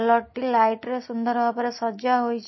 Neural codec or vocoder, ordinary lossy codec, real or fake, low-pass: none; MP3, 24 kbps; real; 7.2 kHz